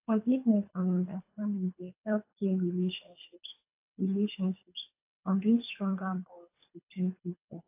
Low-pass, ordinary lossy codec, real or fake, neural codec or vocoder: 3.6 kHz; AAC, 24 kbps; fake; codec, 24 kHz, 3 kbps, HILCodec